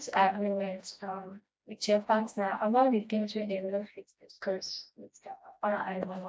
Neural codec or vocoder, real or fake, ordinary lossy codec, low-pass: codec, 16 kHz, 1 kbps, FreqCodec, smaller model; fake; none; none